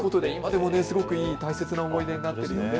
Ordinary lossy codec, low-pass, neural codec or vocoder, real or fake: none; none; none; real